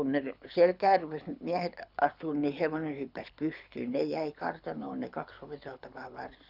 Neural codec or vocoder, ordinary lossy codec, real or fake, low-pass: codec, 24 kHz, 6 kbps, HILCodec; none; fake; 5.4 kHz